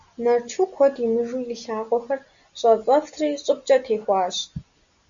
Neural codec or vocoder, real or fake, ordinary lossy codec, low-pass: none; real; Opus, 64 kbps; 7.2 kHz